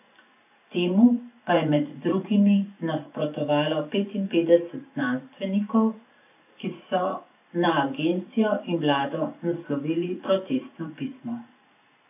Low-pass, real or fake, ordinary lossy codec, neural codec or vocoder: 3.6 kHz; fake; none; vocoder, 44.1 kHz, 128 mel bands every 512 samples, BigVGAN v2